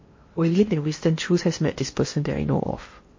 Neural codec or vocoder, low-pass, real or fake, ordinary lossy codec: codec, 16 kHz in and 24 kHz out, 0.8 kbps, FocalCodec, streaming, 65536 codes; 7.2 kHz; fake; MP3, 32 kbps